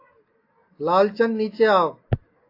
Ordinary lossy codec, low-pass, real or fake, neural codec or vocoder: AAC, 32 kbps; 5.4 kHz; real; none